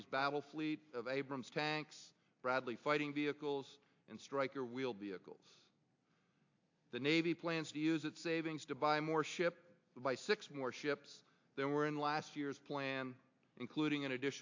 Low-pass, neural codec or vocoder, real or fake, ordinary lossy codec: 7.2 kHz; none; real; AAC, 48 kbps